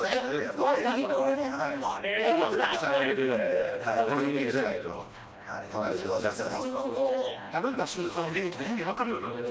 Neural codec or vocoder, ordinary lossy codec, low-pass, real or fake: codec, 16 kHz, 1 kbps, FreqCodec, smaller model; none; none; fake